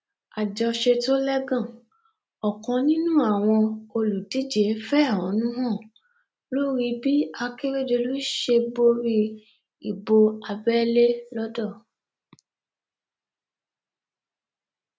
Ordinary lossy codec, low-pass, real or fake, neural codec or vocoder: none; none; real; none